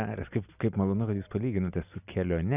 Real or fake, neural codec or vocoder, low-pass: real; none; 3.6 kHz